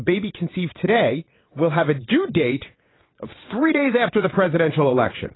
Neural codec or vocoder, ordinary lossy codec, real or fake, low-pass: vocoder, 22.05 kHz, 80 mel bands, WaveNeXt; AAC, 16 kbps; fake; 7.2 kHz